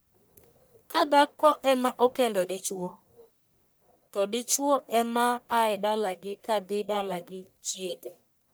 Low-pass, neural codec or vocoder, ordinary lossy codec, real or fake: none; codec, 44.1 kHz, 1.7 kbps, Pupu-Codec; none; fake